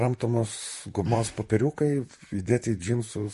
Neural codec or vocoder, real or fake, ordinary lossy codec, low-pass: vocoder, 44.1 kHz, 128 mel bands, Pupu-Vocoder; fake; MP3, 48 kbps; 14.4 kHz